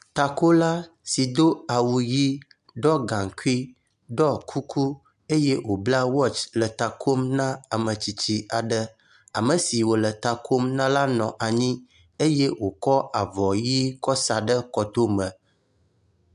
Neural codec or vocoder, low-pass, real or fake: none; 10.8 kHz; real